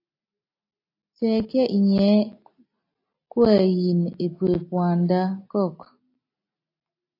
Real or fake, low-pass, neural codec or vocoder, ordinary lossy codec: real; 5.4 kHz; none; AAC, 32 kbps